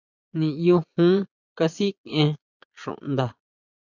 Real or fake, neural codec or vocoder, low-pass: fake; vocoder, 22.05 kHz, 80 mel bands, Vocos; 7.2 kHz